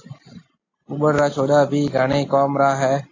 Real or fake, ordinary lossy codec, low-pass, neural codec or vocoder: real; AAC, 32 kbps; 7.2 kHz; none